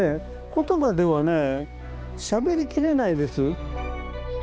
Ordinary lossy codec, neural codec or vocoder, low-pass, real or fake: none; codec, 16 kHz, 2 kbps, X-Codec, HuBERT features, trained on balanced general audio; none; fake